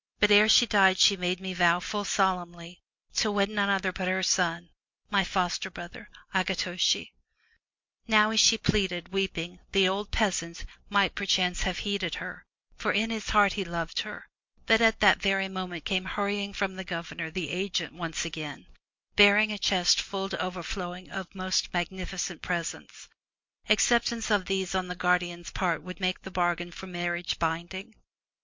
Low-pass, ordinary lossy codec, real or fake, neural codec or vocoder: 7.2 kHz; MP3, 48 kbps; real; none